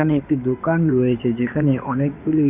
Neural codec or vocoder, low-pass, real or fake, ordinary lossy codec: codec, 16 kHz in and 24 kHz out, 2.2 kbps, FireRedTTS-2 codec; 3.6 kHz; fake; none